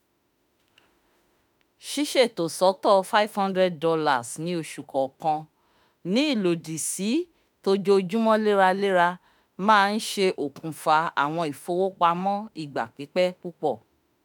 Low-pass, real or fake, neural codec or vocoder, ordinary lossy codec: none; fake; autoencoder, 48 kHz, 32 numbers a frame, DAC-VAE, trained on Japanese speech; none